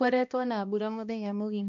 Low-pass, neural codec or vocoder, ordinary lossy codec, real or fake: 7.2 kHz; codec, 16 kHz, 1 kbps, X-Codec, HuBERT features, trained on balanced general audio; none; fake